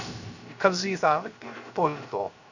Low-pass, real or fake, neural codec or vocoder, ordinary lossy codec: 7.2 kHz; fake; codec, 16 kHz, 0.3 kbps, FocalCodec; none